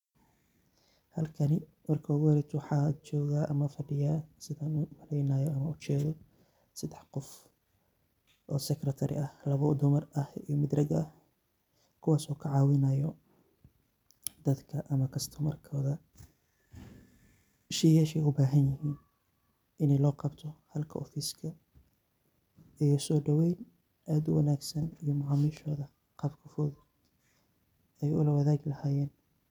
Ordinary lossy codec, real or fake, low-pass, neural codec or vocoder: none; real; 19.8 kHz; none